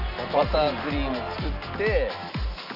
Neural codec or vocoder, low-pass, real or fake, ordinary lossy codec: none; 5.4 kHz; real; none